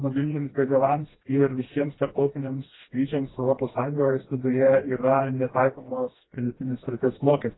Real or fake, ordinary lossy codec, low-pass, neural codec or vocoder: fake; AAC, 16 kbps; 7.2 kHz; codec, 16 kHz, 1 kbps, FreqCodec, smaller model